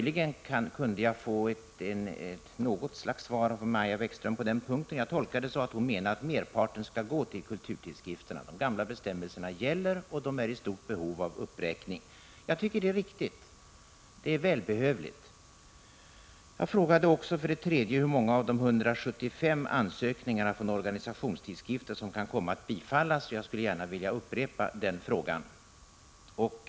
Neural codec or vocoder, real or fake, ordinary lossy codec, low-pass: none; real; none; none